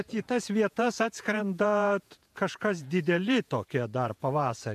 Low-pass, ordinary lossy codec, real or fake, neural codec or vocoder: 14.4 kHz; MP3, 96 kbps; fake; vocoder, 48 kHz, 128 mel bands, Vocos